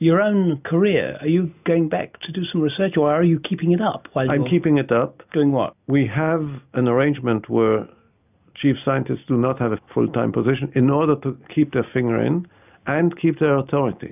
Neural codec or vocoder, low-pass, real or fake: none; 3.6 kHz; real